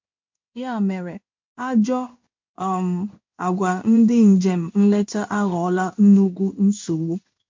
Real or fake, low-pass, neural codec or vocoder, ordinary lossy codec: fake; 7.2 kHz; codec, 16 kHz in and 24 kHz out, 1 kbps, XY-Tokenizer; MP3, 48 kbps